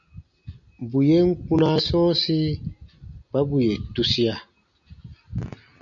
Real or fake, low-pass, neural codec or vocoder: real; 7.2 kHz; none